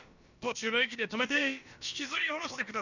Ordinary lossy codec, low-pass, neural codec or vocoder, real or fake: none; 7.2 kHz; codec, 16 kHz, about 1 kbps, DyCAST, with the encoder's durations; fake